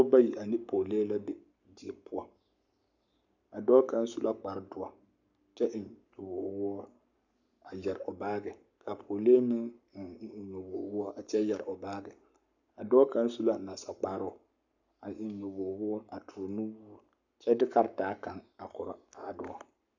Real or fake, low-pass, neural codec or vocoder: fake; 7.2 kHz; codec, 44.1 kHz, 7.8 kbps, Pupu-Codec